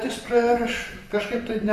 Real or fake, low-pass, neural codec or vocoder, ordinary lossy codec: fake; 14.4 kHz; vocoder, 44.1 kHz, 128 mel bands, Pupu-Vocoder; Opus, 64 kbps